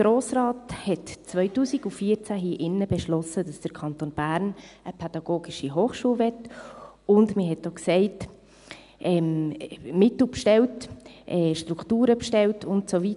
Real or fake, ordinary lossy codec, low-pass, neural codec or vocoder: real; none; 10.8 kHz; none